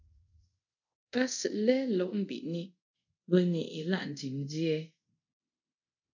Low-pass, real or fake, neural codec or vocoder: 7.2 kHz; fake; codec, 24 kHz, 0.5 kbps, DualCodec